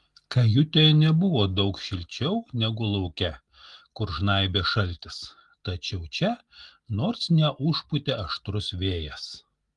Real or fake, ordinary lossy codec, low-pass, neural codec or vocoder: real; Opus, 24 kbps; 10.8 kHz; none